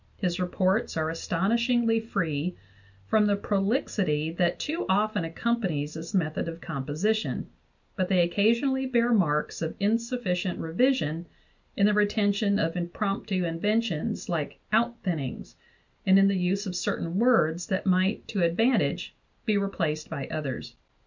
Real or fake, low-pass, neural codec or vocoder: real; 7.2 kHz; none